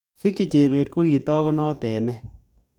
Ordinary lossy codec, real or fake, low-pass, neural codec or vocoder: none; fake; 19.8 kHz; codec, 44.1 kHz, 2.6 kbps, DAC